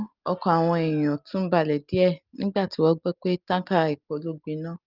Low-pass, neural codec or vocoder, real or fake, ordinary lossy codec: 5.4 kHz; none; real; Opus, 24 kbps